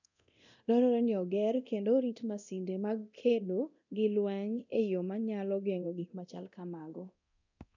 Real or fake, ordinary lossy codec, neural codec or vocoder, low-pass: fake; none; codec, 24 kHz, 0.9 kbps, DualCodec; 7.2 kHz